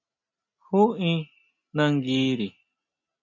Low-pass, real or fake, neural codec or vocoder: 7.2 kHz; real; none